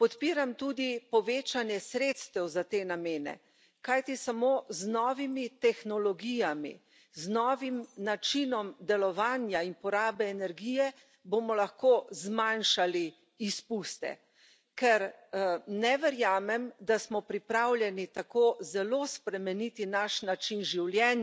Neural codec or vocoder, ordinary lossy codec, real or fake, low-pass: none; none; real; none